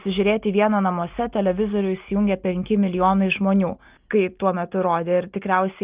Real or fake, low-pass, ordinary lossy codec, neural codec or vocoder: real; 3.6 kHz; Opus, 32 kbps; none